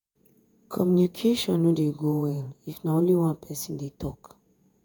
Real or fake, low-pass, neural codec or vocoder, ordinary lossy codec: fake; none; vocoder, 48 kHz, 128 mel bands, Vocos; none